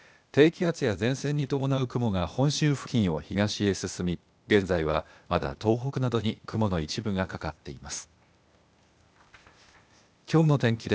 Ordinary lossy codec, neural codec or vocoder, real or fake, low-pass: none; codec, 16 kHz, 0.8 kbps, ZipCodec; fake; none